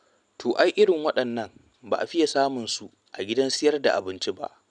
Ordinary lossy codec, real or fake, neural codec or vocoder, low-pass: none; real; none; 9.9 kHz